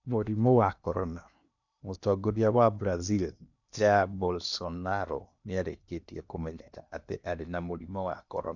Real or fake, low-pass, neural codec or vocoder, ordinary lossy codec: fake; 7.2 kHz; codec, 16 kHz in and 24 kHz out, 0.8 kbps, FocalCodec, streaming, 65536 codes; none